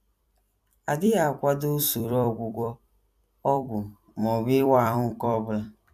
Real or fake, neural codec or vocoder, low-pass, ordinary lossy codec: fake; vocoder, 44.1 kHz, 128 mel bands every 256 samples, BigVGAN v2; 14.4 kHz; none